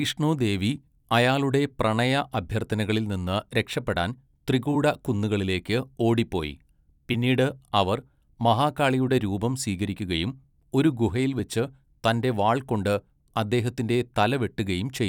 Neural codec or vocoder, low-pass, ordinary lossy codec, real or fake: vocoder, 44.1 kHz, 128 mel bands every 256 samples, BigVGAN v2; 19.8 kHz; none; fake